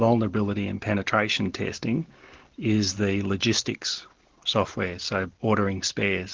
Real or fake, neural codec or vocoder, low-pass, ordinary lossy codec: real; none; 7.2 kHz; Opus, 16 kbps